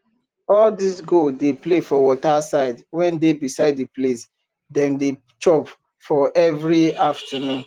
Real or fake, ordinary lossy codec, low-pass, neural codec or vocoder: fake; Opus, 24 kbps; 19.8 kHz; vocoder, 44.1 kHz, 128 mel bands, Pupu-Vocoder